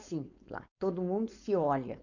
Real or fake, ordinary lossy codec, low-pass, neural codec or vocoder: fake; none; 7.2 kHz; codec, 16 kHz, 4.8 kbps, FACodec